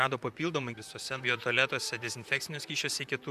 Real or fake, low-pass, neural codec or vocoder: fake; 14.4 kHz; vocoder, 44.1 kHz, 128 mel bands, Pupu-Vocoder